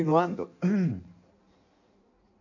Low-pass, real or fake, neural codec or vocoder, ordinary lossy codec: 7.2 kHz; fake; codec, 16 kHz in and 24 kHz out, 1.1 kbps, FireRedTTS-2 codec; none